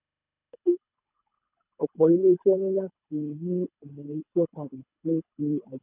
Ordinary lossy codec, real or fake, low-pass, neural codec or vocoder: none; fake; 3.6 kHz; codec, 24 kHz, 3 kbps, HILCodec